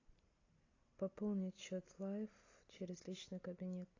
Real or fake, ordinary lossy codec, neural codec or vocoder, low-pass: real; AAC, 32 kbps; none; 7.2 kHz